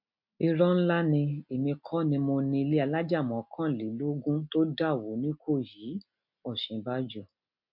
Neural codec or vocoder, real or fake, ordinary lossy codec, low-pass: none; real; MP3, 48 kbps; 5.4 kHz